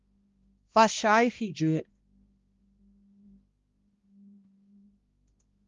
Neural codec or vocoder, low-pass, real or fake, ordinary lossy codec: codec, 16 kHz, 1 kbps, X-Codec, HuBERT features, trained on balanced general audio; 7.2 kHz; fake; Opus, 32 kbps